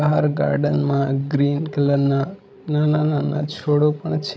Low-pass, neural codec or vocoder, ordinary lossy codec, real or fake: none; codec, 16 kHz, 16 kbps, FreqCodec, larger model; none; fake